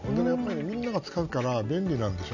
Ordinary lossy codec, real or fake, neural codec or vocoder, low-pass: none; real; none; 7.2 kHz